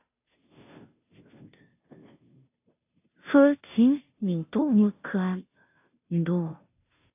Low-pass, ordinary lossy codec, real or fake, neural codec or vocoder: 3.6 kHz; AAC, 32 kbps; fake; codec, 16 kHz, 0.5 kbps, FunCodec, trained on Chinese and English, 25 frames a second